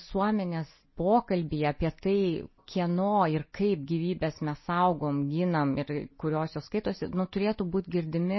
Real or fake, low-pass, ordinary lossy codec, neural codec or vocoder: real; 7.2 kHz; MP3, 24 kbps; none